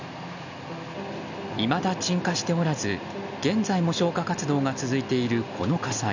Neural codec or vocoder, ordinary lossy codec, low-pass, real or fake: none; none; 7.2 kHz; real